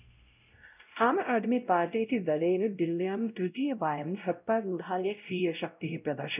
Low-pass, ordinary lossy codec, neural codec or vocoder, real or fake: 3.6 kHz; none; codec, 16 kHz, 0.5 kbps, X-Codec, WavLM features, trained on Multilingual LibriSpeech; fake